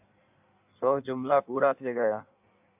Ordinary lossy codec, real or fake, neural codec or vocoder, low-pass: AAC, 32 kbps; fake; codec, 16 kHz in and 24 kHz out, 1.1 kbps, FireRedTTS-2 codec; 3.6 kHz